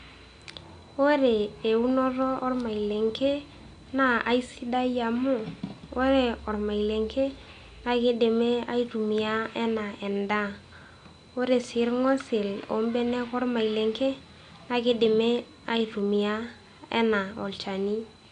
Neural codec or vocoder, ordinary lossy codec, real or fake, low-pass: none; AAC, 64 kbps; real; 9.9 kHz